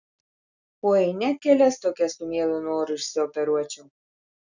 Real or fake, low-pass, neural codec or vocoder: real; 7.2 kHz; none